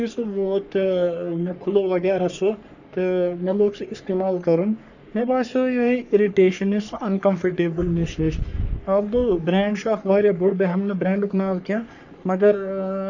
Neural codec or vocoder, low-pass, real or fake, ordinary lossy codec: codec, 44.1 kHz, 3.4 kbps, Pupu-Codec; 7.2 kHz; fake; none